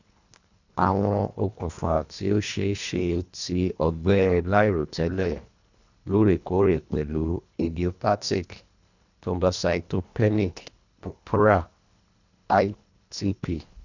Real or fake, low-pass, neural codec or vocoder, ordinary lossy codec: fake; 7.2 kHz; codec, 24 kHz, 1.5 kbps, HILCodec; none